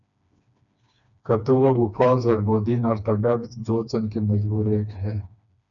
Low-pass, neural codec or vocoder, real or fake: 7.2 kHz; codec, 16 kHz, 2 kbps, FreqCodec, smaller model; fake